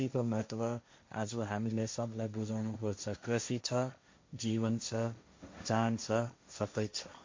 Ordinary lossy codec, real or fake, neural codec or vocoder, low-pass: MP3, 48 kbps; fake; codec, 16 kHz, 1.1 kbps, Voila-Tokenizer; 7.2 kHz